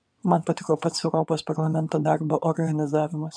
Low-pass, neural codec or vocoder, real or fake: 9.9 kHz; vocoder, 22.05 kHz, 80 mel bands, WaveNeXt; fake